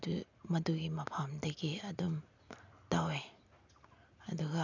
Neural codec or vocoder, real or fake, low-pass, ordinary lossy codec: none; real; 7.2 kHz; none